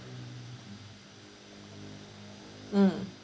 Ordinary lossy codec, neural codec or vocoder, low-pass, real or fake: none; none; none; real